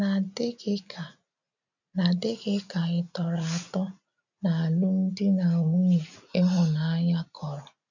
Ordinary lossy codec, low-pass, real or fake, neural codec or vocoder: none; 7.2 kHz; real; none